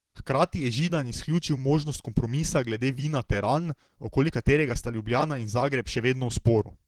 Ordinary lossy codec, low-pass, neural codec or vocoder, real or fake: Opus, 16 kbps; 19.8 kHz; vocoder, 44.1 kHz, 128 mel bands, Pupu-Vocoder; fake